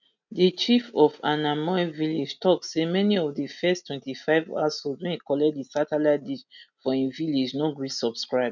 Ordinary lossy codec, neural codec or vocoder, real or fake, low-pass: none; none; real; 7.2 kHz